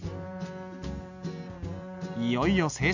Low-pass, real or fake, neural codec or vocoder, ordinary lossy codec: 7.2 kHz; real; none; none